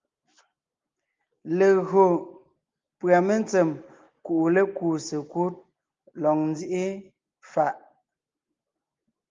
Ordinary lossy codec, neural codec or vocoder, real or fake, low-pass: Opus, 32 kbps; none; real; 7.2 kHz